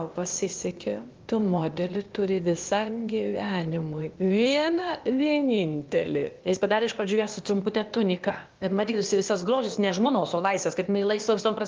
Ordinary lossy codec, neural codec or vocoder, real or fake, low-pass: Opus, 32 kbps; codec, 16 kHz, 0.8 kbps, ZipCodec; fake; 7.2 kHz